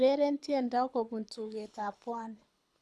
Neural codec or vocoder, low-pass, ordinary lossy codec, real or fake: codec, 24 kHz, 6 kbps, HILCodec; none; none; fake